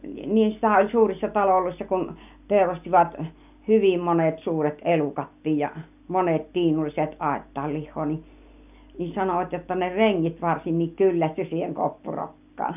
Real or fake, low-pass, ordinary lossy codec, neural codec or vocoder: real; 3.6 kHz; none; none